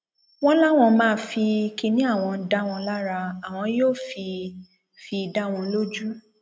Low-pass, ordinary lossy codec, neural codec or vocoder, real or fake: none; none; none; real